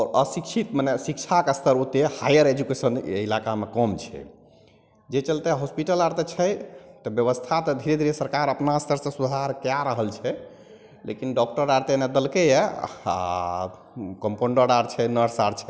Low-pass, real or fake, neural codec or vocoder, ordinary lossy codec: none; real; none; none